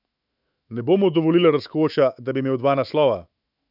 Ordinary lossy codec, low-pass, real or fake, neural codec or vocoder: none; 5.4 kHz; fake; autoencoder, 48 kHz, 128 numbers a frame, DAC-VAE, trained on Japanese speech